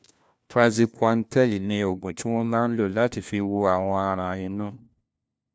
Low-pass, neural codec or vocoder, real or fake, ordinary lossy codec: none; codec, 16 kHz, 1 kbps, FunCodec, trained on LibriTTS, 50 frames a second; fake; none